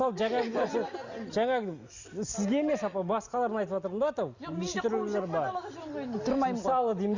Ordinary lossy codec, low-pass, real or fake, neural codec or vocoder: Opus, 64 kbps; 7.2 kHz; real; none